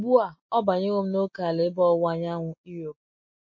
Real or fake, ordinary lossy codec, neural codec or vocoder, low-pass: real; MP3, 32 kbps; none; 7.2 kHz